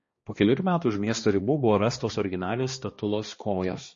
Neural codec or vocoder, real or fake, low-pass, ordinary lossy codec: codec, 16 kHz, 2 kbps, X-Codec, HuBERT features, trained on balanced general audio; fake; 7.2 kHz; MP3, 32 kbps